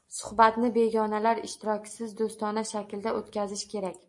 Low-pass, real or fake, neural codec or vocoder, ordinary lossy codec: 10.8 kHz; real; none; MP3, 48 kbps